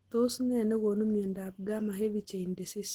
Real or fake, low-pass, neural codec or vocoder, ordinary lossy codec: real; 19.8 kHz; none; Opus, 16 kbps